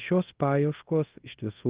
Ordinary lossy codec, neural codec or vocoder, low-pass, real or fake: Opus, 16 kbps; codec, 24 kHz, 0.5 kbps, DualCodec; 3.6 kHz; fake